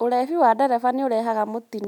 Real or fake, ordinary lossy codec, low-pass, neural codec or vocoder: real; none; 19.8 kHz; none